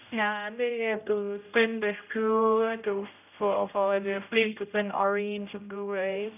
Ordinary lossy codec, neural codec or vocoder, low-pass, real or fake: none; codec, 16 kHz, 0.5 kbps, X-Codec, HuBERT features, trained on general audio; 3.6 kHz; fake